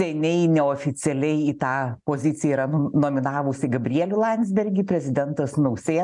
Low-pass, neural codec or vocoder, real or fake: 10.8 kHz; none; real